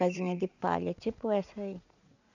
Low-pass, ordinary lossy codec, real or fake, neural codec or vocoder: 7.2 kHz; none; fake; vocoder, 44.1 kHz, 80 mel bands, Vocos